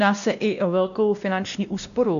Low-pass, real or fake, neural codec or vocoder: 7.2 kHz; fake; codec, 16 kHz, 1 kbps, X-Codec, WavLM features, trained on Multilingual LibriSpeech